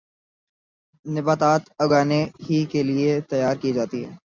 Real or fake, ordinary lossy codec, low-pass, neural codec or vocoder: real; MP3, 64 kbps; 7.2 kHz; none